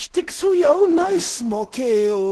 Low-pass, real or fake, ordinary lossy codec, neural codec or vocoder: 10.8 kHz; fake; Opus, 16 kbps; codec, 16 kHz in and 24 kHz out, 0.4 kbps, LongCat-Audio-Codec, two codebook decoder